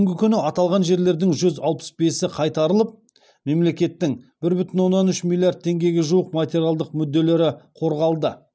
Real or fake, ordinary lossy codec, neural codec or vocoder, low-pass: real; none; none; none